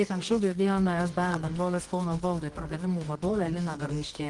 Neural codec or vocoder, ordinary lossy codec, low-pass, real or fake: codec, 24 kHz, 0.9 kbps, WavTokenizer, medium music audio release; Opus, 24 kbps; 10.8 kHz; fake